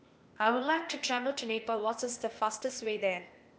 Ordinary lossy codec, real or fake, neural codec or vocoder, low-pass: none; fake; codec, 16 kHz, 0.8 kbps, ZipCodec; none